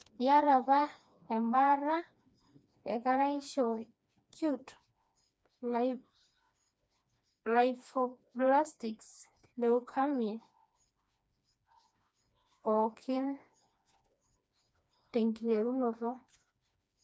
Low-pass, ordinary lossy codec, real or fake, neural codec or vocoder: none; none; fake; codec, 16 kHz, 2 kbps, FreqCodec, smaller model